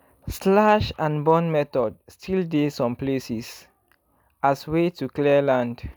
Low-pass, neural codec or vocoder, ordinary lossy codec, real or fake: none; none; none; real